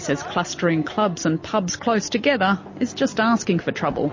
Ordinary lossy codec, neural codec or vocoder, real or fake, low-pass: MP3, 32 kbps; none; real; 7.2 kHz